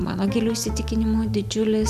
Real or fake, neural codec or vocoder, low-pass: real; none; 14.4 kHz